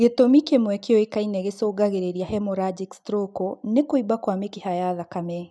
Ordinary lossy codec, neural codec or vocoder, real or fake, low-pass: none; none; real; none